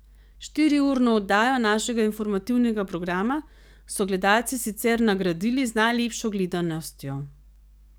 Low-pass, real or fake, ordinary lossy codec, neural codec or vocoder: none; fake; none; codec, 44.1 kHz, 7.8 kbps, DAC